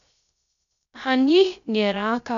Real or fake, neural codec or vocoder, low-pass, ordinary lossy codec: fake; codec, 16 kHz, 0.3 kbps, FocalCodec; 7.2 kHz; none